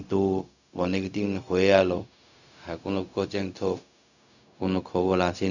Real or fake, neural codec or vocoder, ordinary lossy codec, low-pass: fake; codec, 16 kHz, 0.4 kbps, LongCat-Audio-Codec; none; 7.2 kHz